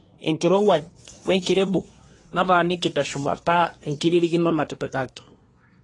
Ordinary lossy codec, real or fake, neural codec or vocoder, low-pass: AAC, 32 kbps; fake; codec, 24 kHz, 1 kbps, SNAC; 10.8 kHz